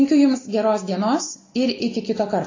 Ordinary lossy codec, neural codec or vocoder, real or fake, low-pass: AAC, 32 kbps; none; real; 7.2 kHz